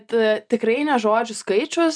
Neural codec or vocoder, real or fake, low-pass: none; real; 9.9 kHz